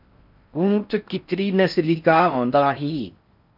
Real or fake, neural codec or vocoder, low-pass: fake; codec, 16 kHz in and 24 kHz out, 0.6 kbps, FocalCodec, streaming, 4096 codes; 5.4 kHz